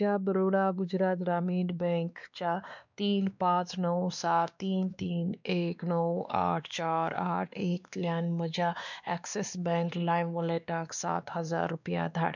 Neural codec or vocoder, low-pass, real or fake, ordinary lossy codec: codec, 16 kHz, 2 kbps, X-Codec, WavLM features, trained on Multilingual LibriSpeech; 7.2 kHz; fake; none